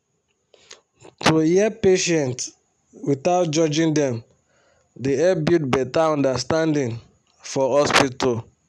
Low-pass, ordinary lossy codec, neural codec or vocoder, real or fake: none; none; none; real